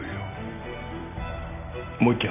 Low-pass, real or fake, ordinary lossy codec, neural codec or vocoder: 3.6 kHz; fake; none; vocoder, 44.1 kHz, 128 mel bands every 256 samples, BigVGAN v2